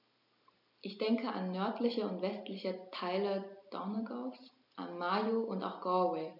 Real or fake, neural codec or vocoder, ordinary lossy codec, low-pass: real; none; none; 5.4 kHz